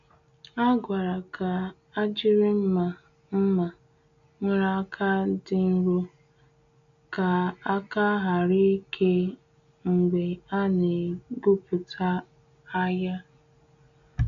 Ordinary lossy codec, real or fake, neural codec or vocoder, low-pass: AAC, 64 kbps; real; none; 7.2 kHz